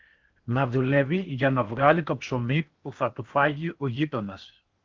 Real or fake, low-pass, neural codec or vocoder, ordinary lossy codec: fake; 7.2 kHz; codec, 16 kHz in and 24 kHz out, 0.8 kbps, FocalCodec, streaming, 65536 codes; Opus, 32 kbps